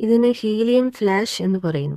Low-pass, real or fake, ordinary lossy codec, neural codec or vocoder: 14.4 kHz; fake; MP3, 96 kbps; codec, 32 kHz, 1.9 kbps, SNAC